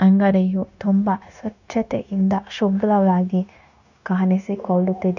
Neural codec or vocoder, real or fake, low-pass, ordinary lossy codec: codec, 16 kHz, 0.9 kbps, LongCat-Audio-Codec; fake; 7.2 kHz; none